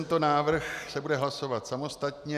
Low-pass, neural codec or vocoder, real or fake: 14.4 kHz; none; real